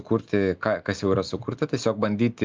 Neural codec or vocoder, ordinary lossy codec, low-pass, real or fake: none; Opus, 24 kbps; 7.2 kHz; real